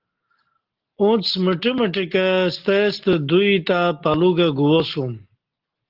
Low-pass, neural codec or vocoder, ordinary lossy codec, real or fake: 5.4 kHz; none; Opus, 16 kbps; real